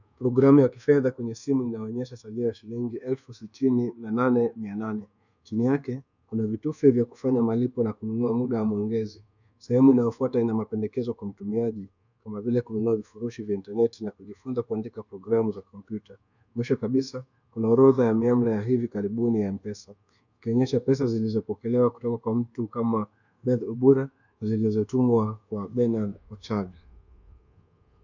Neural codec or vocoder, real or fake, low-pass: codec, 24 kHz, 1.2 kbps, DualCodec; fake; 7.2 kHz